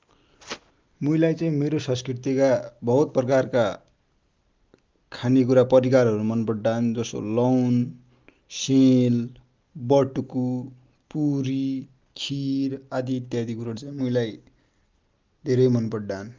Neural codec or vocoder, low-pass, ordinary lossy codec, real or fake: none; 7.2 kHz; Opus, 24 kbps; real